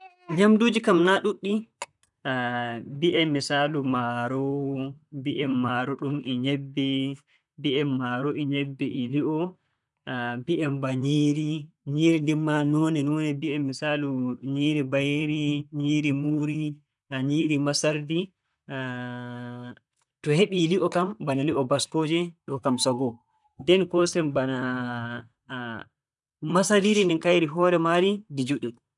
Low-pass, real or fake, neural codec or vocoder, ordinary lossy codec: 10.8 kHz; fake; vocoder, 44.1 kHz, 128 mel bands, Pupu-Vocoder; none